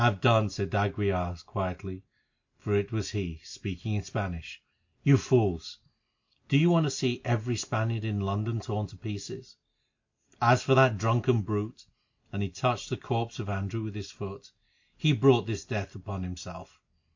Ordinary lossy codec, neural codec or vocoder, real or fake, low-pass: MP3, 48 kbps; none; real; 7.2 kHz